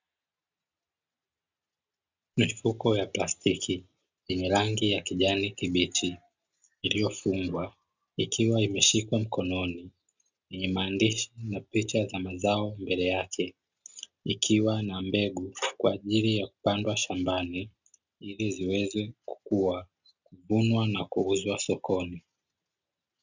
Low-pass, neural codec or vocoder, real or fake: 7.2 kHz; none; real